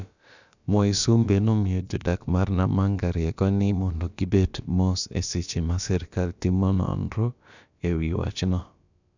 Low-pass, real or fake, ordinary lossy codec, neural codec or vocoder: 7.2 kHz; fake; none; codec, 16 kHz, about 1 kbps, DyCAST, with the encoder's durations